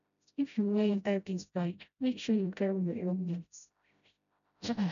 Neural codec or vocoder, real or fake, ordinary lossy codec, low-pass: codec, 16 kHz, 0.5 kbps, FreqCodec, smaller model; fake; none; 7.2 kHz